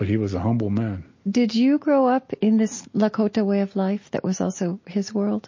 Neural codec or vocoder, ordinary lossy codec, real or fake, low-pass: none; MP3, 32 kbps; real; 7.2 kHz